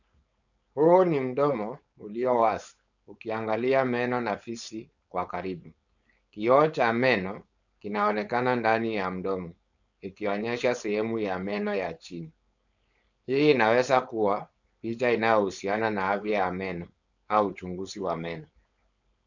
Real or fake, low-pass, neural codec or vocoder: fake; 7.2 kHz; codec, 16 kHz, 4.8 kbps, FACodec